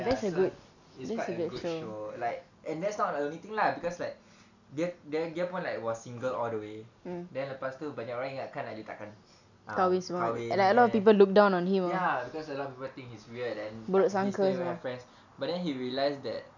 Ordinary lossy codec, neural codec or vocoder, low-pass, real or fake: none; none; 7.2 kHz; real